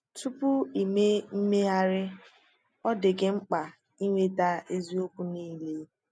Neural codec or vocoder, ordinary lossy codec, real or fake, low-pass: none; none; real; none